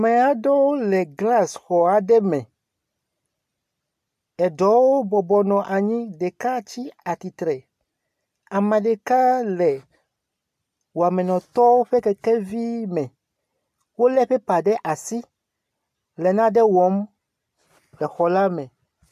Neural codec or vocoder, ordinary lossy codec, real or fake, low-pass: none; AAC, 96 kbps; real; 14.4 kHz